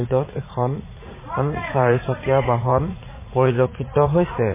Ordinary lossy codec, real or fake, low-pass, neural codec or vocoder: MP3, 16 kbps; fake; 3.6 kHz; vocoder, 44.1 kHz, 80 mel bands, Vocos